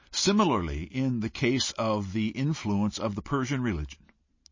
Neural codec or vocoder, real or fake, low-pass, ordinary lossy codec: none; real; 7.2 kHz; MP3, 32 kbps